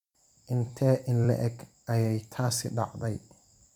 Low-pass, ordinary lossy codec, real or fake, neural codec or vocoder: 19.8 kHz; none; fake; vocoder, 44.1 kHz, 128 mel bands every 256 samples, BigVGAN v2